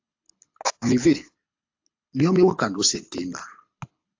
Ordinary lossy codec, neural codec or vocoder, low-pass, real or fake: AAC, 48 kbps; codec, 24 kHz, 6 kbps, HILCodec; 7.2 kHz; fake